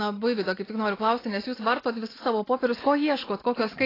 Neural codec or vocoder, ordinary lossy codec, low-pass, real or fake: none; AAC, 24 kbps; 5.4 kHz; real